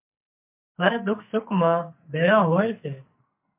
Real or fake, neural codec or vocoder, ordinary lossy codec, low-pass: fake; codec, 44.1 kHz, 2.6 kbps, SNAC; MP3, 32 kbps; 3.6 kHz